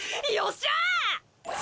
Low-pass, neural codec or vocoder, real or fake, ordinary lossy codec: none; none; real; none